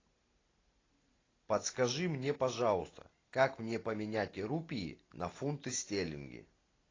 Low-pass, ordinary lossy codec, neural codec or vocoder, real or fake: 7.2 kHz; AAC, 32 kbps; none; real